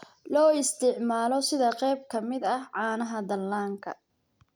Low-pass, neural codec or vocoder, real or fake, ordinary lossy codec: none; none; real; none